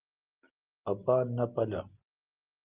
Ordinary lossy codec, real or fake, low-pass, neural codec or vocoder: Opus, 32 kbps; real; 3.6 kHz; none